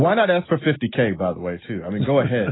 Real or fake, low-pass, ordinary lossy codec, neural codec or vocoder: real; 7.2 kHz; AAC, 16 kbps; none